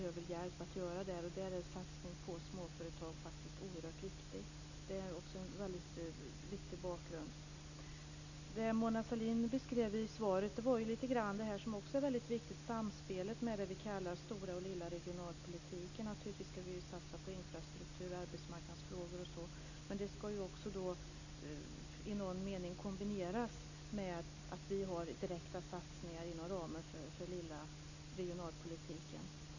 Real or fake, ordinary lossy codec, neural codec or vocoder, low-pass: real; none; none; 7.2 kHz